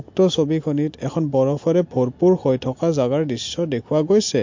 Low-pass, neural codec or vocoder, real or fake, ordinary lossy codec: 7.2 kHz; none; real; MP3, 48 kbps